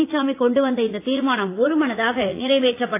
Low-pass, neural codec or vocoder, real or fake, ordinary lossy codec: 3.6 kHz; vocoder, 44.1 kHz, 80 mel bands, Vocos; fake; AAC, 24 kbps